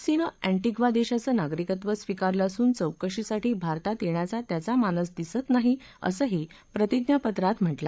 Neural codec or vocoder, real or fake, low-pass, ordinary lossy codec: codec, 16 kHz, 8 kbps, FreqCodec, larger model; fake; none; none